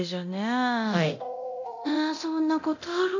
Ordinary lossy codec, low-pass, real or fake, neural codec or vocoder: none; 7.2 kHz; fake; codec, 24 kHz, 0.9 kbps, DualCodec